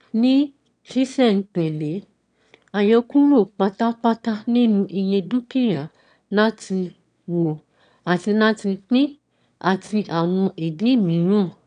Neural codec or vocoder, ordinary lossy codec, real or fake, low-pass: autoencoder, 22.05 kHz, a latent of 192 numbers a frame, VITS, trained on one speaker; none; fake; 9.9 kHz